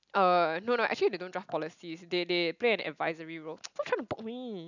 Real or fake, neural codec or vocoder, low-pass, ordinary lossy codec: real; none; 7.2 kHz; none